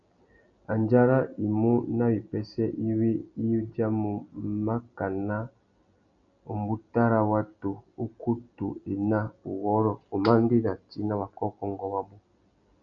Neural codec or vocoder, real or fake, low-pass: none; real; 7.2 kHz